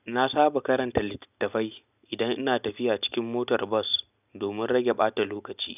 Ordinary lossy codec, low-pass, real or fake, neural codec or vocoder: none; 3.6 kHz; real; none